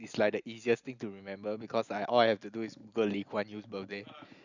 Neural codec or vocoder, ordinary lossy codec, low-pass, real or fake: none; none; 7.2 kHz; real